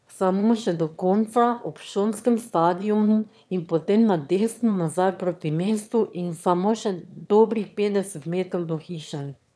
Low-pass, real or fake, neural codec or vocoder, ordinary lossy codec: none; fake; autoencoder, 22.05 kHz, a latent of 192 numbers a frame, VITS, trained on one speaker; none